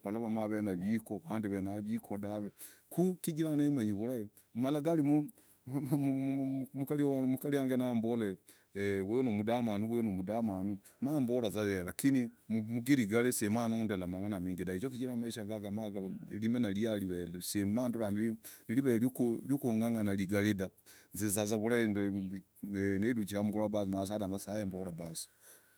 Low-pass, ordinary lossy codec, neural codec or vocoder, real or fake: none; none; autoencoder, 48 kHz, 32 numbers a frame, DAC-VAE, trained on Japanese speech; fake